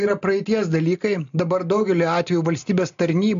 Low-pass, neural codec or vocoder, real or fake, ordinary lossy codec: 7.2 kHz; none; real; MP3, 64 kbps